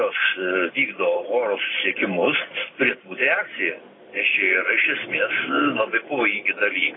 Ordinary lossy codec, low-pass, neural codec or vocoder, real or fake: AAC, 16 kbps; 7.2 kHz; none; real